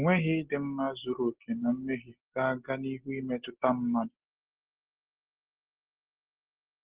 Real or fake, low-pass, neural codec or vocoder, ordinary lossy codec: real; 3.6 kHz; none; Opus, 16 kbps